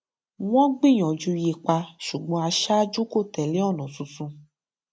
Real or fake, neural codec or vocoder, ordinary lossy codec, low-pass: real; none; none; none